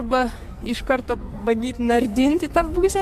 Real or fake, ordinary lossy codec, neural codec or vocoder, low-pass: fake; MP3, 64 kbps; codec, 44.1 kHz, 2.6 kbps, SNAC; 14.4 kHz